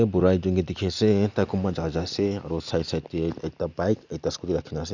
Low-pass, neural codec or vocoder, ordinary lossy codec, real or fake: 7.2 kHz; none; none; real